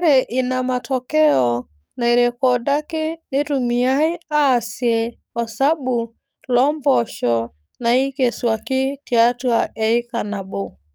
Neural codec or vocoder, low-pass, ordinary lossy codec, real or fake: codec, 44.1 kHz, 7.8 kbps, DAC; none; none; fake